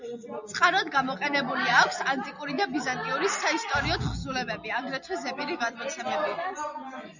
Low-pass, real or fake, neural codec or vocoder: 7.2 kHz; real; none